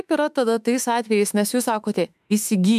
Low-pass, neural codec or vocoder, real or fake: 14.4 kHz; autoencoder, 48 kHz, 32 numbers a frame, DAC-VAE, trained on Japanese speech; fake